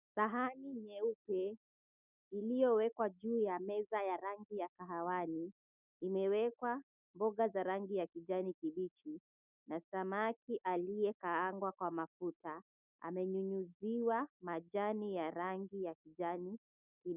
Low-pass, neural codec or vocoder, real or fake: 3.6 kHz; none; real